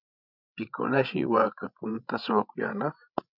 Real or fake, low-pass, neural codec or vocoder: fake; 5.4 kHz; codec, 16 kHz, 16 kbps, FreqCodec, larger model